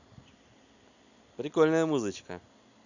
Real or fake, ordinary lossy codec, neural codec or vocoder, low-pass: real; none; none; 7.2 kHz